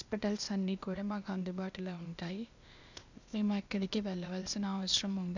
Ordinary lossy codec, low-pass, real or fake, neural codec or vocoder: none; 7.2 kHz; fake; codec, 16 kHz, 0.8 kbps, ZipCodec